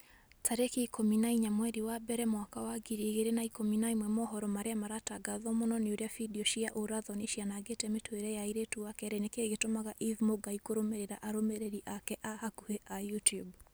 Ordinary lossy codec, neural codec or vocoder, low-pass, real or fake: none; none; none; real